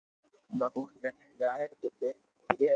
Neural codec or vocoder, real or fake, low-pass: codec, 16 kHz in and 24 kHz out, 1.1 kbps, FireRedTTS-2 codec; fake; 9.9 kHz